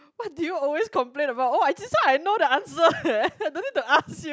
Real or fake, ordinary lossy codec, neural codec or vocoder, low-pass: real; none; none; none